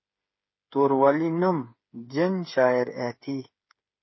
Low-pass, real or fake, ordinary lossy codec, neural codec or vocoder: 7.2 kHz; fake; MP3, 24 kbps; codec, 16 kHz, 8 kbps, FreqCodec, smaller model